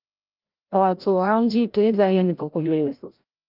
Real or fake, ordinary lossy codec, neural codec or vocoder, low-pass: fake; Opus, 32 kbps; codec, 16 kHz, 0.5 kbps, FreqCodec, larger model; 5.4 kHz